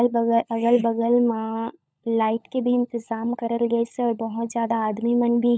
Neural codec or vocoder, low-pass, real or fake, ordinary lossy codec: codec, 16 kHz, 16 kbps, FunCodec, trained on LibriTTS, 50 frames a second; none; fake; none